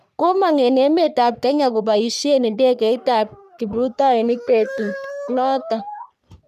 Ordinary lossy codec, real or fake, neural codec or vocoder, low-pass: none; fake; codec, 44.1 kHz, 3.4 kbps, Pupu-Codec; 14.4 kHz